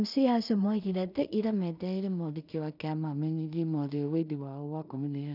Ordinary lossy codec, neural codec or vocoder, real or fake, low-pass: none; codec, 16 kHz in and 24 kHz out, 0.4 kbps, LongCat-Audio-Codec, two codebook decoder; fake; 5.4 kHz